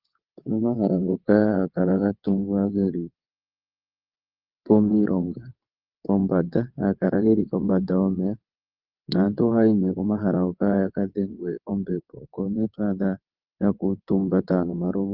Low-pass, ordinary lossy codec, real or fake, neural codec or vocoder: 5.4 kHz; Opus, 32 kbps; fake; vocoder, 22.05 kHz, 80 mel bands, WaveNeXt